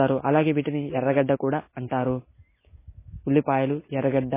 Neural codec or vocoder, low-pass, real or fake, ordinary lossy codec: none; 3.6 kHz; real; MP3, 16 kbps